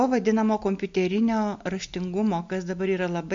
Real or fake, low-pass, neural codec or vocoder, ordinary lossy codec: real; 7.2 kHz; none; MP3, 48 kbps